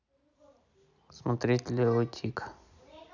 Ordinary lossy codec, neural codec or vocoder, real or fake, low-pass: none; none; real; 7.2 kHz